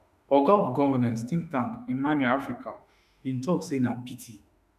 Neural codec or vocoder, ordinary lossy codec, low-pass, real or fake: autoencoder, 48 kHz, 32 numbers a frame, DAC-VAE, trained on Japanese speech; none; 14.4 kHz; fake